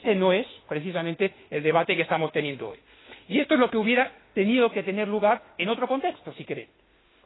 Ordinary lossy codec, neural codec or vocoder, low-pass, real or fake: AAC, 16 kbps; autoencoder, 48 kHz, 32 numbers a frame, DAC-VAE, trained on Japanese speech; 7.2 kHz; fake